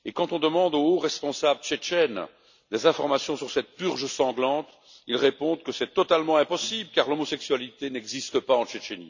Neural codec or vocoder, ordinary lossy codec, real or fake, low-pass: none; none; real; 7.2 kHz